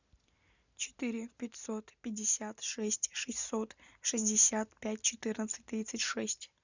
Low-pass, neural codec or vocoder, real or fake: 7.2 kHz; none; real